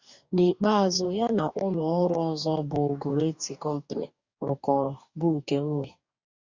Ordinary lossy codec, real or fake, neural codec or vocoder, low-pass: Opus, 64 kbps; fake; codec, 44.1 kHz, 2.6 kbps, DAC; 7.2 kHz